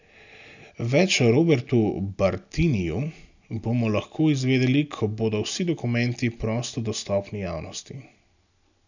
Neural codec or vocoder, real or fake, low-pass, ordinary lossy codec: none; real; 7.2 kHz; none